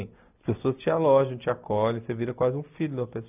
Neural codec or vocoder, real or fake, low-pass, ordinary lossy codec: none; real; 3.6 kHz; none